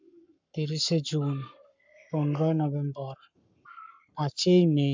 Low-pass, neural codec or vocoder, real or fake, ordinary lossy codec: 7.2 kHz; codec, 44.1 kHz, 7.8 kbps, Pupu-Codec; fake; MP3, 64 kbps